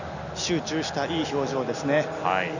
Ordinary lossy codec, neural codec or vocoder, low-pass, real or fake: none; none; 7.2 kHz; real